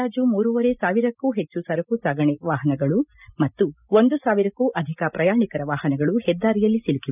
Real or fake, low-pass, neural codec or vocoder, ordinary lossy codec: real; 3.6 kHz; none; none